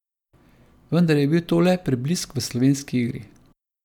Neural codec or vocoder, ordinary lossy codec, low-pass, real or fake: none; none; 19.8 kHz; real